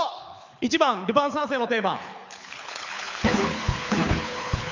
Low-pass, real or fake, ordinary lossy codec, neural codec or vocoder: 7.2 kHz; fake; MP3, 64 kbps; codec, 24 kHz, 6 kbps, HILCodec